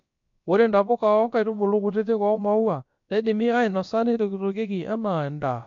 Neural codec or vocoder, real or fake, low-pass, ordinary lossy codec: codec, 16 kHz, about 1 kbps, DyCAST, with the encoder's durations; fake; 7.2 kHz; MP3, 48 kbps